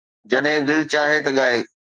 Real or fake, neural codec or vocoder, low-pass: fake; codec, 44.1 kHz, 2.6 kbps, SNAC; 9.9 kHz